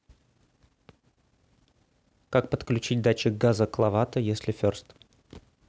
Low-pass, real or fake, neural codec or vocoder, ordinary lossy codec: none; real; none; none